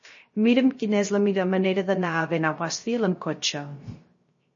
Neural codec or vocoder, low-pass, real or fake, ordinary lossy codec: codec, 16 kHz, 0.3 kbps, FocalCodec; 7.2 kHz; fake; MP3, 32 kbps